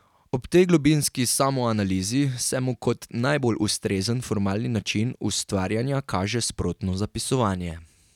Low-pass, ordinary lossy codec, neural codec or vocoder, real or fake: 19.8 kHz; none; none; real